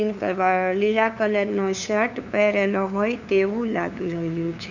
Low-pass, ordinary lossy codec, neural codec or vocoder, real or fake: 7.2 kHz; none; codec, 16 kHz, 2 kbps, FunCodec, trained on LibriTTS, 25 frames a second; fake